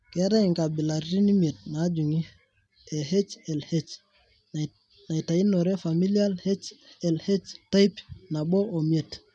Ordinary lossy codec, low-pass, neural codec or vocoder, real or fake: none; none; none; real